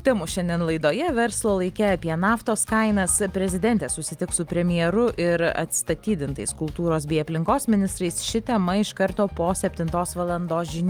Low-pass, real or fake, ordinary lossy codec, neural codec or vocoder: 19.8 kHz; real; Opus, 32 kbps; none